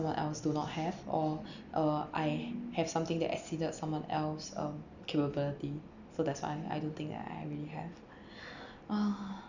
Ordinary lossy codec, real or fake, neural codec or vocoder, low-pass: none; real; none; 7.2 kHz